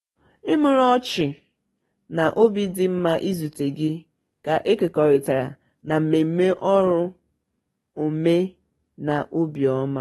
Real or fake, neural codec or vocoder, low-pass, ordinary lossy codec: fake; codec, 44.1 kHz, 7.8 kbps, DAC; 19.8 kHz; AAC, 32 kbps